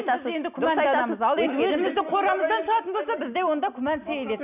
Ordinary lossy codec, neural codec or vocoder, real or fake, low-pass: none; none; real; 3.6 kHz